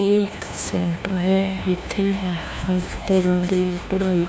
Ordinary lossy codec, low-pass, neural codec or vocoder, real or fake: none; none; codec, 16 kHz, 1 kbps, FunCodec, trained on LibriTTS, 50 frames a second; fake